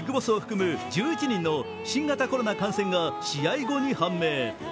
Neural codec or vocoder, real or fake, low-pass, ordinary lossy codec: none; real; none; none